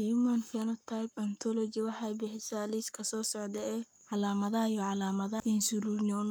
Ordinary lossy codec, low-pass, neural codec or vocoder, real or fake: none; none; codec, 44.1 kHz, 7.8 kbps, Pupu-Codec; fake